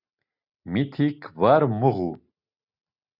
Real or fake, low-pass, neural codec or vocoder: real; 5.4 kHz; none